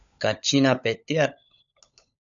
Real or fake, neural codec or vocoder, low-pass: fake; codec, 16 kHz, 16 kbps, FunCodec, trained on LibriTTS, 50 frames a second; 7.2 kHz